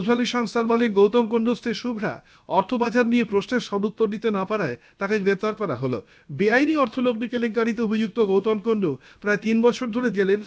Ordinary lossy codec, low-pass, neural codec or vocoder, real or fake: none; none; codec, 16 kHz, about 1 kbps, DyCAST, with the encoder's durations; fake